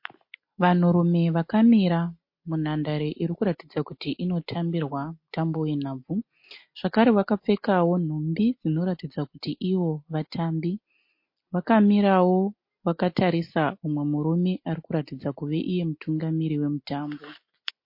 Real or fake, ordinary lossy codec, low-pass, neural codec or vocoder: real; MP3, 32 kbps; 5.4 kHz; none